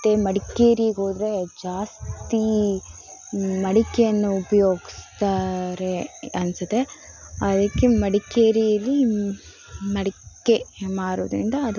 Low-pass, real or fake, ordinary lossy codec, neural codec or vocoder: 7.2 kHz; real; none; none